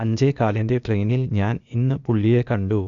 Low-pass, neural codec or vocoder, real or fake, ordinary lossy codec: 7.2 kHz; codec, 16 kHz, 0.8 kbps, ZipCodec; fake; Opus, 64 kbps